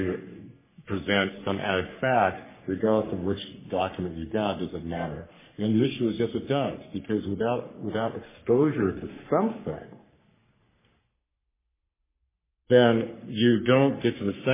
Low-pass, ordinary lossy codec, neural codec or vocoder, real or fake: 3.6 kHz; MP3, 16 kbps; codec, 44.1 kHz, 3.4 kbps, Pupu-Codec; fake